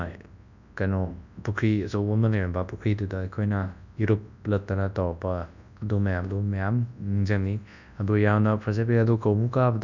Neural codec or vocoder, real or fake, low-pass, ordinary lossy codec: codec, 24 kHz, 0.9 kbps, WavTokenizer, large speech release; fake; 7.2 kHz; none